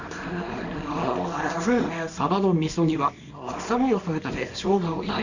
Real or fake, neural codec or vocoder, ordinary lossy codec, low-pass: fake; codec, 24 kHz, 0.9 kbps, WavTokenizer, small release; none; 7.2 kHz